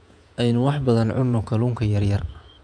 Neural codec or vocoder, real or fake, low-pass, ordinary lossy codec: vocoder, 48 kHz, 128 mel bands, Vocos; fake; 9.9 kHz; none